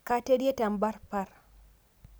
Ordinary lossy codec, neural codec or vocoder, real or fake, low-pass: none; none; real; none